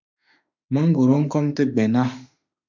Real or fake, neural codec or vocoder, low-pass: fake; autoencoder, 48 kHz, 32 numbers a frame, DAC-VAE, trained on Japanese speech; 7.2 kHz